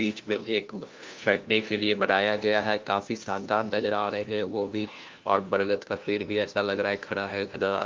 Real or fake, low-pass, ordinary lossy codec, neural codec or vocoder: fake; 7.2 kHz; Opus, 24 kbps; codec, 16 kHz, 1 kbps, FunCodec, trained on LibriTTS, 50 frames a second